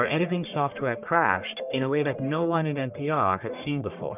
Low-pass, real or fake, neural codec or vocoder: 3.6 kHz; fake; codec, 44.1 kHz, 1.7 kbps, Pupu-Codec